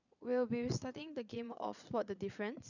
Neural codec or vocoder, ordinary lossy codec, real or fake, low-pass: vocoder, 22.05 kHz, 80 mel bands, Vocos; none; fake; 7.2 kHz